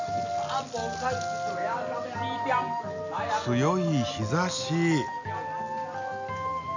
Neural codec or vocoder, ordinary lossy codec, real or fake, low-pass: none; none; real; 7.2 kHz